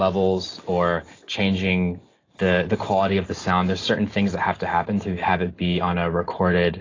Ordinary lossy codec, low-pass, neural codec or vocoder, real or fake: AAC, 32 kbps; 7.2 kHz; none; real